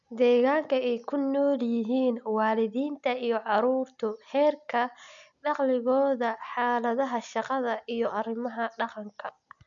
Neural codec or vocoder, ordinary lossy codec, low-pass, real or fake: none; none; 7.2 kHz; real